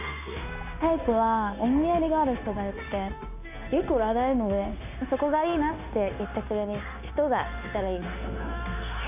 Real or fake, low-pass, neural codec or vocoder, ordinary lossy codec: fake; 3.6 kHz; codec, 16 kHz, 0.9 kbps, LongCat-Audio-Codec; none